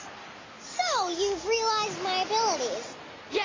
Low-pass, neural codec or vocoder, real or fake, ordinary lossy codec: 7.2 kHz; none; real; AAC, 32 kbps